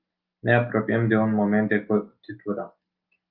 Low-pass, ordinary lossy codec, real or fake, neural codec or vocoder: 5.4 kHz; Opus, 24 kbps; real; none